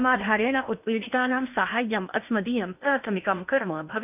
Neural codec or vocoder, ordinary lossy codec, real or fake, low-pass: codec, 16 kHz in and 24 kHz out, 0.8 kbps, FocalCodec, streaming, 65536 codes; none; fake; 3.6 kHz